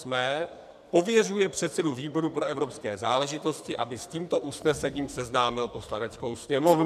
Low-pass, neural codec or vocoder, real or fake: 14.4 kHz; codec, 44.1 kHz, 2.6 kbps, SNAC; fake